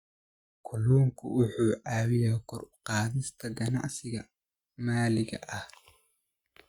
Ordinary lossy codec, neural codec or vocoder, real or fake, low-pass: Opus, 64 kbps; none; real; 19.8 kHz